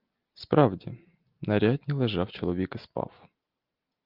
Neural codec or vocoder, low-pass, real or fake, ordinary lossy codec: none; 5.4 kHz; real; Opus, 32 kbps